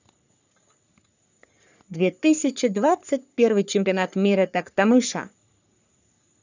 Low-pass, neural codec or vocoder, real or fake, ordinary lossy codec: 7.2 kHz; codec, 44.1 kHz, 3.4 kbps, Pupu-Codec; fake; none